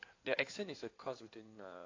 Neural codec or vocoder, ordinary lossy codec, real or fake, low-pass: codec, 16 kHz in and 24 kHz out, 2.2 kbps, FireRedTTS-2 codec; AAC, 32 kbps; fake; 7.2 kHz